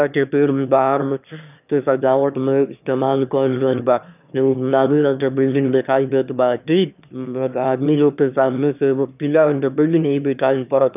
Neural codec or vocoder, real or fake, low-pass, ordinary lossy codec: autoencoder, 22.05 kHz, a latent of 192 numbers a frame, VITS, trained on one speaker; fake; 3.6 kHz; none